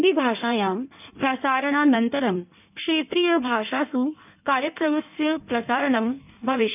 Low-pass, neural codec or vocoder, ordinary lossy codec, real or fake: 3.6 kHz; codec, 16 kHz in and 24 kHz out, 1.1 kbps, FireRedTTS-2 codec; none; fake